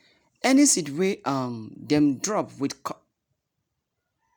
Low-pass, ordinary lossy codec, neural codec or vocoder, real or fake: 19.8 kHz; none; none; real